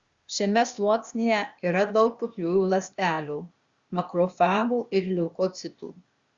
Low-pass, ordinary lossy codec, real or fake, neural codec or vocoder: 7.2 kHz; Opus, 64 kbps; fake; codec, 16 kHz, 0.8 kbps, ZipCodec